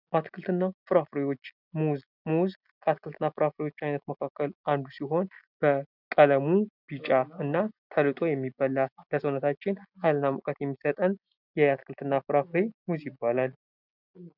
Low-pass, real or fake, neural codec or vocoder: 5.4 kHz; real; none